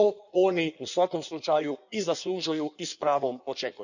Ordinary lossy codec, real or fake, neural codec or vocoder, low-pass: none; fake; codec, 16 kHz in and 24 kHz out, 1.1 kbps, FireRedTTS-2 codec; 7.2 kHz